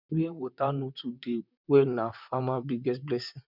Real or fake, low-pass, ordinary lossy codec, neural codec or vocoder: fake; 5.4 kHz; none; vocoder, 44.1 kHz, 128 mel bands, Pupu-Vocoder